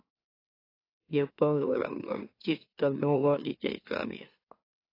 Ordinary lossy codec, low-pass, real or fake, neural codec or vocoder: AAC, 24 kbps; 5.4 kHz; fake; autoencoder, 44.1 kHz, a latent of 192 numbers a frame, MeloTTS